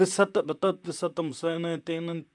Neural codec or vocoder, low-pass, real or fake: vocoder, 44.1 kHz, 128 mel bands, Pupu-Vocoder; 10.8 kHz; fake